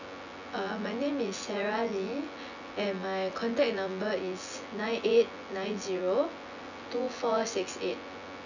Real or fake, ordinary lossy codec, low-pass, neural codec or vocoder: fake; none; 7.2 kHz; vocoder, 24 kHz, 100 mel bands, Vocos